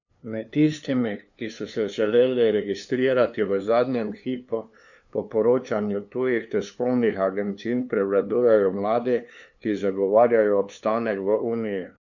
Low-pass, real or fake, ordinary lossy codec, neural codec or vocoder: 7.2 kHz; fake; none; codec, 16 kHz, 2 kbps, FunCodec, trained on LibriTTS, 25 frames a second